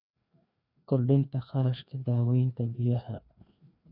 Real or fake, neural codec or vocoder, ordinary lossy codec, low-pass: fake; codec, 16 kHz, 2 kbps, FreqCodec, larger model; none; 5.4 kHz